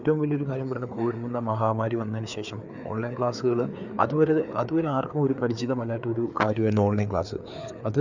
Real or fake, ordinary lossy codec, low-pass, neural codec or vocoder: fake; none; 7.2 kHz; codec, 16 kHz, 4 kbps, FreqCodec, larger model